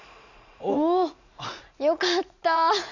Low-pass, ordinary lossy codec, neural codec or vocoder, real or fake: 7.2 kHz; none; vocoder, 44.1 kHz, 80 mel bands, Vocos; fake